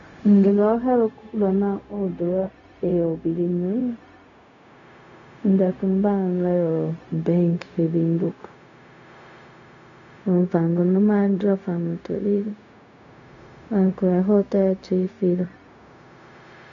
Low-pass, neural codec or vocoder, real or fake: 7.2 kHz; codec, 16 kHz, 0.4 kbps, LongCat-Audio-Codec; fake